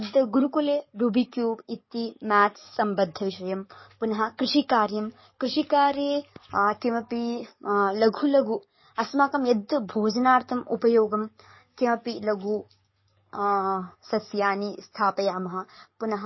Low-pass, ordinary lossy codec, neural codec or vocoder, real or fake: 7.2 kHz; MP3, 24 kbps; codec, 44.1 kHz, 7.8 kbps, DAC; fake